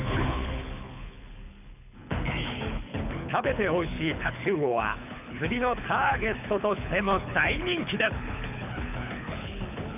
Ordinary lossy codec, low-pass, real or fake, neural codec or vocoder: none; 3.6 kHz; fake; codec, 24 kHz, 6 kbps, HILCodec